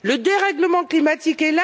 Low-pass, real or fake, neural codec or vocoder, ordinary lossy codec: none; real; none; none